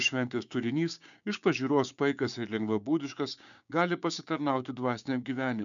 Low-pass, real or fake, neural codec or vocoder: 7.2 kHz; fake; codec, 16 kHz, 6 kbps, DAC